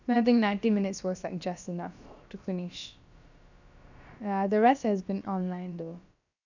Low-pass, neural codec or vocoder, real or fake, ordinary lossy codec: 7.2 kHz; codec, 16 kHz, about 1 kbps, DyCAST, with the encoder's durations; fake; none